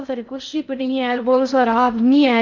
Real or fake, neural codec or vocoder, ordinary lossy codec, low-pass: fake; codec, 16 kHz in and 24 kHz out, 0.6 kbps, FocalCodec, streaming, 2048 codes; none; 7.2 kHz